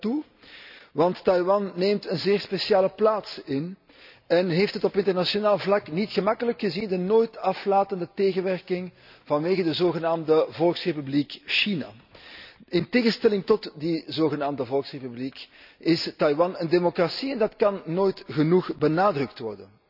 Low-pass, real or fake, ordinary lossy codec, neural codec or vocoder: 5.4 kHz; real; none; none